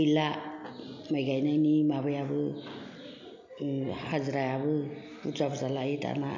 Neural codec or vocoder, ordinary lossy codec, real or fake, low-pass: none; MP3, 48 kbps; real; 7.2 kHz